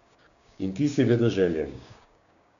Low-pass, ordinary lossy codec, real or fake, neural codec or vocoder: 7.2 kHz; none; fake; codec, 16 kHz, 6 kbps, DAC